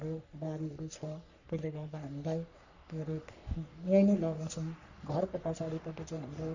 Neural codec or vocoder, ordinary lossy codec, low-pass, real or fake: codec, 44.1 kHz, 3.4 kbps, Pupu-Codec; none; 7.2 kHz; fake